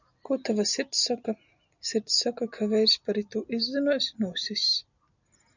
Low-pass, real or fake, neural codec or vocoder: 7.2 kHz; real; none